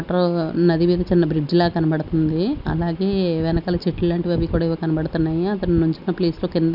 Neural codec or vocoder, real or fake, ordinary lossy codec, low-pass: none; real; none; 5.4 kHz